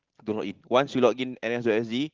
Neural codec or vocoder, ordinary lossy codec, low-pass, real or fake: none; Opus, 16 kbps; 7.2 kHz; real